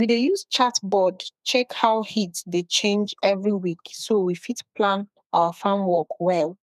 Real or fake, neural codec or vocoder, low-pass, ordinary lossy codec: fake; codec, 32 kHz, 1.9 kbps, SNAC; 14.4 kHz; none